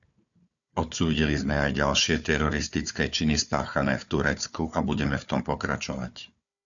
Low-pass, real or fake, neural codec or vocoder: 7.2 kHz; fake; codec, 16 kHz, 4 kbps, FunCodec, trained on Chinese and English, 50 frames a second